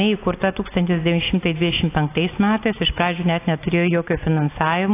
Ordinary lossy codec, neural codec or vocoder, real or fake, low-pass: AAC, 24 kbps; none; real; 3.6 kHz